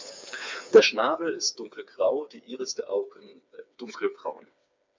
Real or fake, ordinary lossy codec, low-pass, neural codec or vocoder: fake; MP3, 64 kbps; 7.2 kHz; codec, 16 kHz, 4 kbps, FreqCodec, smaller model